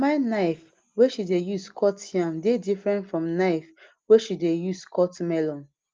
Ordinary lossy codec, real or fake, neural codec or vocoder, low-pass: Opus, 24 kbps; real; none; 7.2 kHz